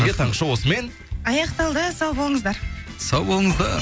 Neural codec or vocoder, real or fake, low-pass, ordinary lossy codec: none; real; none; none